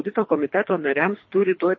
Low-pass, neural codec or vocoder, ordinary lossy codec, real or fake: 7.2 kHz; codec, 24 kHz, 3 kbps, HILCodec; MP3, 32 kbps; fake